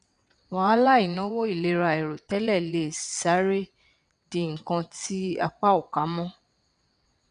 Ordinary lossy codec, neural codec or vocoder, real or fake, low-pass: none; vocoder, 22.05 kHz, 80 mel bands, WaveNeXt; fake; 9.9 kHz